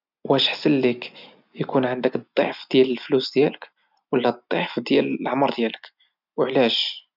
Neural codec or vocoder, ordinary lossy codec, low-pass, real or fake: none; none; 5.4 kHz; real